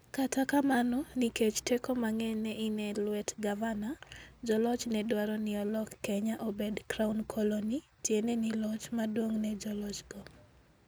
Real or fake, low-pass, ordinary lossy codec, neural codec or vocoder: real; none; none; none